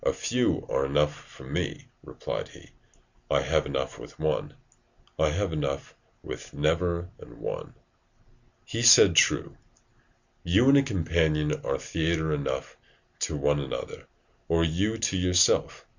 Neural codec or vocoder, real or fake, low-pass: none; real; 7.2 kHz